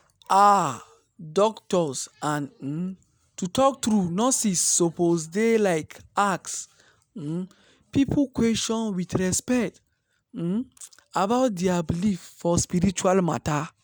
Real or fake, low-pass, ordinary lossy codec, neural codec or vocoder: real; none; none; none